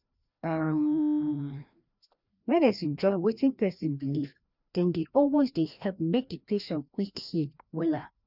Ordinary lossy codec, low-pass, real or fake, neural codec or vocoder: none; 5.4 kHz; fake; codec, 16 kHz, 1 kbps, FreqCodec, larger model